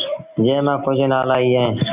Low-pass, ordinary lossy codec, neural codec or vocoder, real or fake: 3.6 kHz; Opus, 64 kbps; none; real